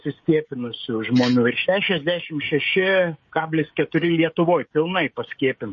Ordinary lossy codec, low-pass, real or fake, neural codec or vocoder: MP3, 32 kbps; 7.2 kHz; fake; codec, 16 kHz, 16 kbps, FreqCodec, smaller model